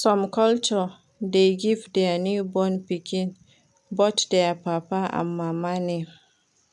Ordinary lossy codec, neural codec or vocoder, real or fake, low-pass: none; none; real; none